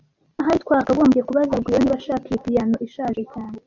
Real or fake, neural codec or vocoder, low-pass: real; none; 7.2 kHz